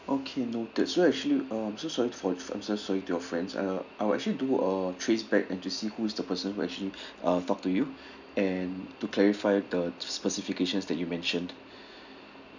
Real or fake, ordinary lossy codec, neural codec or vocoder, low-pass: real; none; none; 7.2 kHz